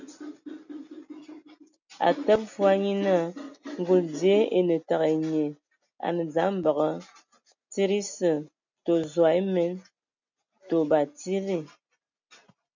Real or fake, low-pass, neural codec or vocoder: real; 7.2 kHz; none